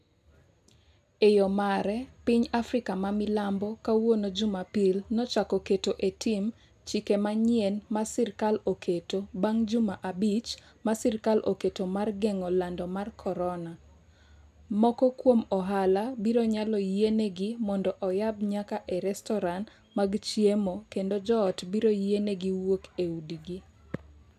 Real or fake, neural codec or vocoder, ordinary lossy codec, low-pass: real; none; none; 14.4 kHz